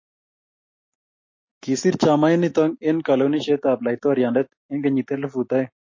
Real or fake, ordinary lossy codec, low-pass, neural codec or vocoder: real; MP3, 32 kbps; 7.2 kHz; none